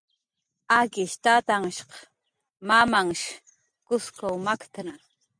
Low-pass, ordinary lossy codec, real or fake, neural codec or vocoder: 9.9 kHz; MP3, 96 kbps; real; none